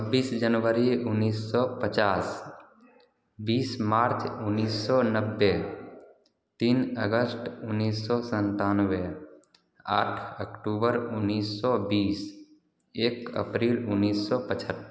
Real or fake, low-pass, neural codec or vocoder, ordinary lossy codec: real; none; none; none